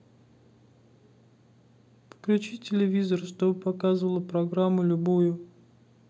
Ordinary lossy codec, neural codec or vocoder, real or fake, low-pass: none; none; real; none